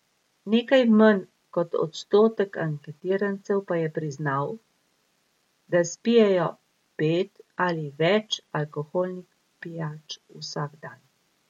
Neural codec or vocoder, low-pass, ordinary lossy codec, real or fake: none; 19.8 kHz; MP3, 64 kbps; real